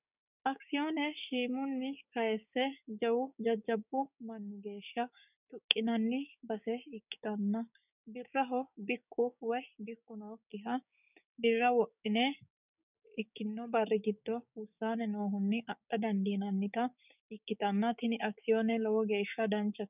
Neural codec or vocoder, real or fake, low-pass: codec, 16 kHz, 6 kbps, DAC; fake; 3.6 kHz